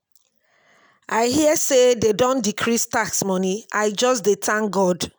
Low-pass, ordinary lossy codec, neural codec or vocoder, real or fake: none; none; none; real